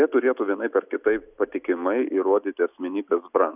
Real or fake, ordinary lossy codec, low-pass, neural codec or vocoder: real; Opus, 64 kbps; 3.6 kHz; none